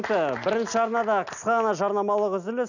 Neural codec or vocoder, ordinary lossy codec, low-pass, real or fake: none; none; 7.2 kHz; real